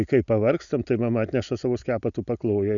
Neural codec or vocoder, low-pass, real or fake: none; 7.2 kHz; real